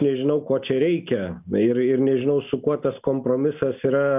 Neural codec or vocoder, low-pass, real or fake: none; 3.6 kHz; real